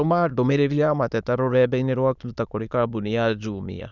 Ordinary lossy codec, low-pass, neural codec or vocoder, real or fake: none; 7.2 kHz; autoencoder, 22.05 kHz, a latent of 192 numbers a frame, VITS, trained on many speakers; fake